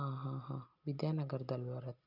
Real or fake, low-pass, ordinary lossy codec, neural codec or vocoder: real; 5.4 kHz; none; none